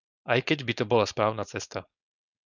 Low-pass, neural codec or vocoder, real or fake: 7.2 kHz; codec, 16 kHz, 4.8 kbps, FACodec; fake